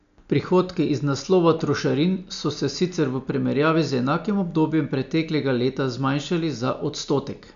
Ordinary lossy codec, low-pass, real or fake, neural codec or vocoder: none; 7.2 kHz; real; none